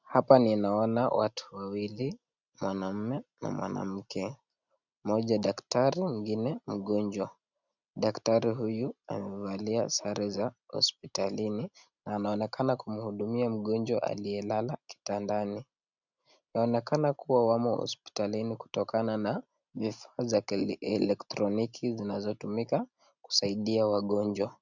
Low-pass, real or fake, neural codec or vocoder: 7.2 kHz; real; none